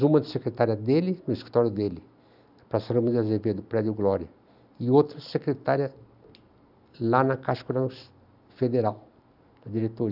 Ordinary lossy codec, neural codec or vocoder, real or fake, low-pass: none; none; real; 5.4 kHz